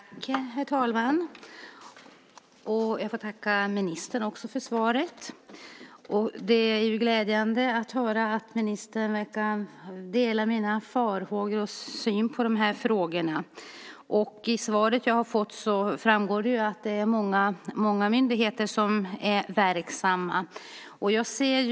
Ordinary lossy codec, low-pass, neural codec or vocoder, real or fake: none; none; none; real